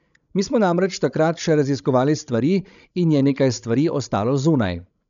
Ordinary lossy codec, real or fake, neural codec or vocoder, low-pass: none; fake; codec, 16 kHz, 16 kbps, FreqCodec, larger model; 7.2 kHz